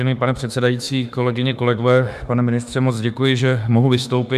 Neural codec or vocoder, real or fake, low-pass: autoencoder, 48 kHz, 32 numbers a frame, DAC-VAE, trained on Japanese speech; fake; 14.4 kHz